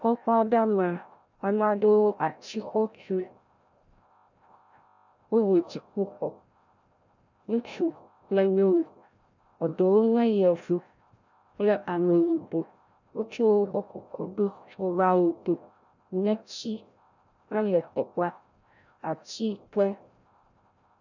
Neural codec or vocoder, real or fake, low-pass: codec, 16 kHz, 0.5 kbps, FreqCodec, larger model; fake; 7.2 kHz